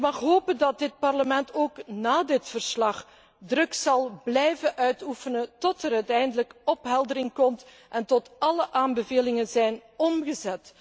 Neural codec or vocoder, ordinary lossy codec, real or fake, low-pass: none; none; real; none